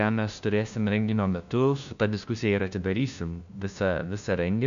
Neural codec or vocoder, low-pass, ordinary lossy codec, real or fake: codec, 16 kHz, 1 kbps, FunCodec, trained on LibriTTS, 50 frames a second; 7.2 kHz; MP3, 96 kbps; fake